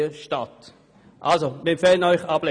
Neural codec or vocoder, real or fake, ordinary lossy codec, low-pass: none; real; none; 9.9 kHz